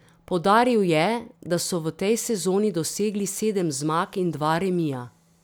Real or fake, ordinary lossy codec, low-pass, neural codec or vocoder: real; none; none; none